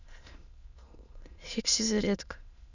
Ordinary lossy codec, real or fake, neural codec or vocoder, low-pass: none; fake; autoencoder, 22.05 kHz, a latent of 192 numbers a frame, VITS, trained on many speakers; 7.2 kHz